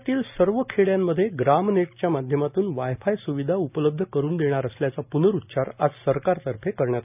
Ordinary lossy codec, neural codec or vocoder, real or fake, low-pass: none; none; real; 3.6 kHz